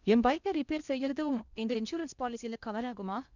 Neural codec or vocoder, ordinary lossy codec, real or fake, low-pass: codec, 16 kHz in and 24 kHz out, 0.8 kbps, FocalCodec, streaming, 65536 codes; none; fake; 7.2 kHz